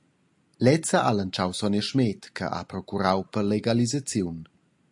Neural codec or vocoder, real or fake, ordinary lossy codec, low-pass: none; real; AAC, 64 kbps; 10.8 kHz